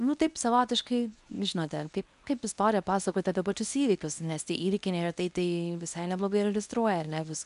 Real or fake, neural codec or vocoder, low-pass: fake; codec, 24 kHz, 0.9 kbps, WavTokenizer, medium speech release version 1; 10.8 kHz